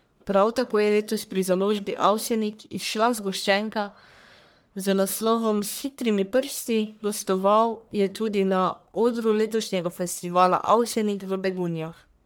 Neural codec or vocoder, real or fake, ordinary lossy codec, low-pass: codec, 44.1 kHz, 1.7 kbps, Pupu-Codec; fake; none; none